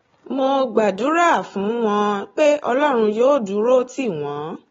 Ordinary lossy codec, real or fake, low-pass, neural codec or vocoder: AAC, 24 kbps; real; 7.2 kHz; none